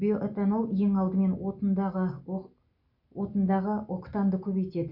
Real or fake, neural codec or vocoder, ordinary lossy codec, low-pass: real; none; none; 5.4 kHz